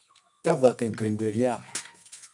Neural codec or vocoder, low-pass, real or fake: codec, 24 kHz, 0.9 kbps, WavTokenizer, medium music audio release; 10.8 kHz; fake